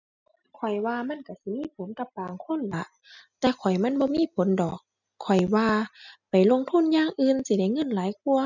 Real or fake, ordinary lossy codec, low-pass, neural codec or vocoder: real; none; 7.2 kHz; none